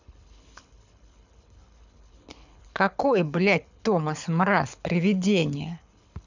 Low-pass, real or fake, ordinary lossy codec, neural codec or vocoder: 7.2 kHz; fake; none; codec, 24 kHz, 6 kbps, HILCodec